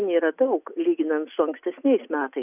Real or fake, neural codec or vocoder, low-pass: real; none; 3.6 kHz